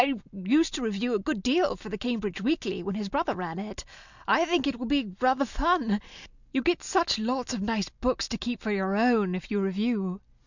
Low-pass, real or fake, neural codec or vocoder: 7.2 kHz; real; none